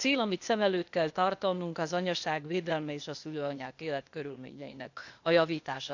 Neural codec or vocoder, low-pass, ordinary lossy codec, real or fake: codec, 16 kHz, 0.8 kbps, ZipCodec; 7.2 kHz; none; fake